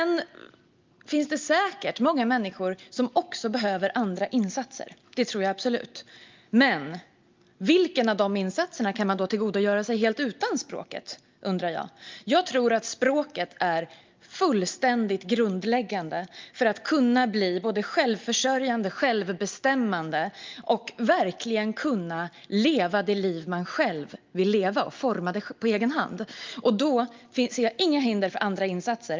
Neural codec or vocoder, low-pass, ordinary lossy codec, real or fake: none; 7.2 kHz; Opus, 24 kbps; real